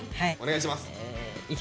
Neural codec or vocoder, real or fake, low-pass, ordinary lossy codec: none; real; none; none